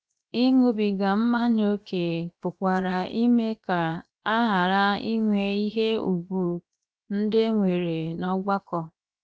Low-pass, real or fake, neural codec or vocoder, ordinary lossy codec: none; fake; codec, 16 kHz, 0.7 kbps, FocalCodec; none